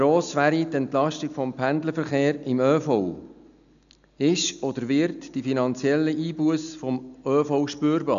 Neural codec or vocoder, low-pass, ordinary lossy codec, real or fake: none; 7.2 kHz; none; real